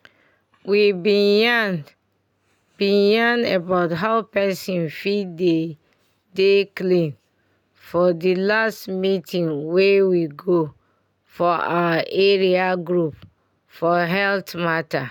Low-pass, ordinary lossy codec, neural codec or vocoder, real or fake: 19.8 kHz; none; none; real